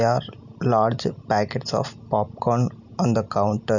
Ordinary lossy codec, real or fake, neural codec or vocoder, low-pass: none; real; none; 7.2 kHz